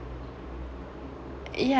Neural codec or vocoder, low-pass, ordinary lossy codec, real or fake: none; none; none; real